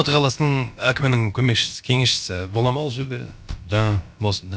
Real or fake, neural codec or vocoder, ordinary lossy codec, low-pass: fake; codec, 16 kHz, about 1 kbps, DyCAST, with the encoder's durations; none; none